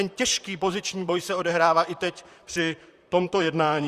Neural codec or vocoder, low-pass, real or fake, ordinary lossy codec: vocoder, 44.1 kHz, 128 mel bands, Pupu-Vocoder; 14.4 kHz; fake; Opus, 64 kbps